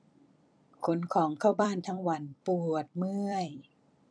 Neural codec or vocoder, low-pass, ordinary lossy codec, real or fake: vocoder, 44.1 kHz, 128 mel bands every 256 samples, BigVGAN v2; 9.9 kHz; MP3, 96 kbps; fake